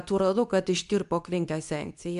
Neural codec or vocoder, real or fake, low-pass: codec, 24 kHz, 0.9 kbps, WavTokenizer, medium speech release version 2; fake; 10.8 kHz